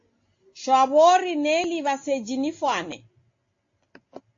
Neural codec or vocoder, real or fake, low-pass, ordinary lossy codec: none; real; 7.2 kHz; AAC, 48 kbps